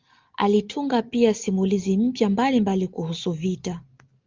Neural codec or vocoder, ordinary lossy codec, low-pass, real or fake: none; Opus, 24 kbps; 7.2 kHz; real